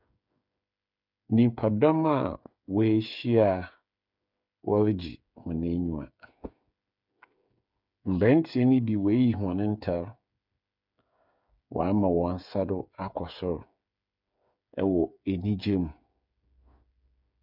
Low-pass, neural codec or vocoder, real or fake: 5.4 kHz; codec, 16 kHz, 8 kbps, FreqCodec, smaller model; fake